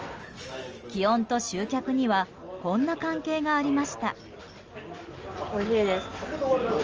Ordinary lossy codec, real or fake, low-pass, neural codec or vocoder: Opus, 24 kbps; real; 7.2 kHz; none